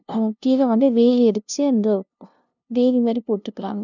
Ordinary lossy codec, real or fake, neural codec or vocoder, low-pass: none; fake; codec, 16 kHz, 0.5 kbps, FunCodec, trained on LibriTTS, 25 frames a second; 7.2 kHz